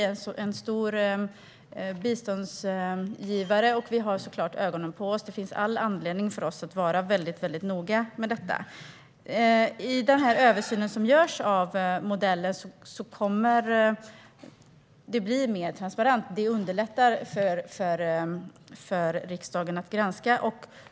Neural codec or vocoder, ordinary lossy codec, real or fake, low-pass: none; none; real; none